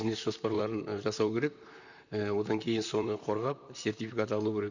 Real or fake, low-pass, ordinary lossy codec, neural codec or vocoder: fake; 7.2 kHz; none; vocoder, 44.1 kHz, 128 mel bands, Pupu-Vocoder